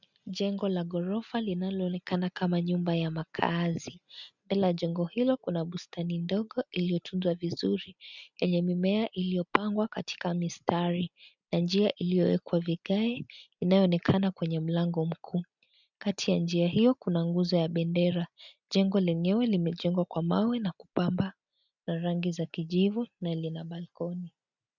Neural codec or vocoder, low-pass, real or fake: none; 7.2 kHz; real